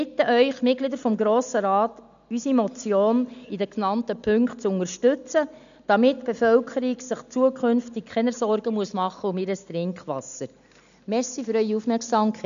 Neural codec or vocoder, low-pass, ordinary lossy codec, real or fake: none; 7.2 kHz; none; real